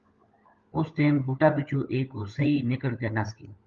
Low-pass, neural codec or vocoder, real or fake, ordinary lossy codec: 7.2 kHz; codec, 16 kHz, 16 kbps, FunCodec, trained on LibriTTS, 50 frames a second; fake; Opus, 32 kbps